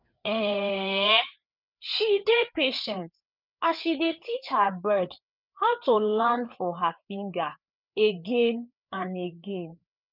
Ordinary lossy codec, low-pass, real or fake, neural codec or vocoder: none; 5.4 kHz; fake; codec, 16 kHz, 4 kbps, FreqCodec, larger model